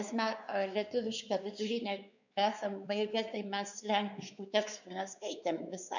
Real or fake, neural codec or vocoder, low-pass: fake; codec, 16 kHz, 4 kbps, X-Codec, WavLM features, trained on Multilingual LibriSpeech; 7.2 kHz